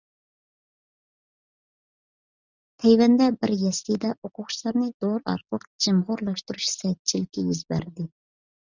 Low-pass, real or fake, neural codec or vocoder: 7.2 kHz; real; none